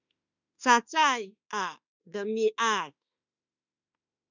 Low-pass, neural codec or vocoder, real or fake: 7.2 kHz; autoencoder, 48 kHz, 32 numbers a frame, DAC-VAE, trained on Japanese speech; fake